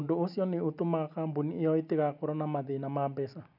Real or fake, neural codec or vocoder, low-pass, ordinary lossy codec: real; none; 5.4 kHz; none